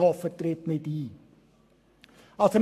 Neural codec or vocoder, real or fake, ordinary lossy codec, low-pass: codec, 44.1 kHz, 7.8 kbps, Pupu-Codec; fake; AAC, 96 kbps; 14.4 kHz